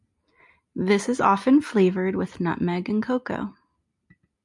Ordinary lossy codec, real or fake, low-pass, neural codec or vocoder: MP3, 96 kbps; real; 10.8 kHz; none